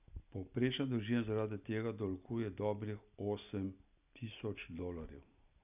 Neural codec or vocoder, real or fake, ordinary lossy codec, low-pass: none; real; none; 3.6 kHz